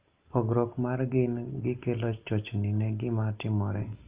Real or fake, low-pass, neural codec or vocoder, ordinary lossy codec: real; 3.6 kHz; none; Opus, 32 kbps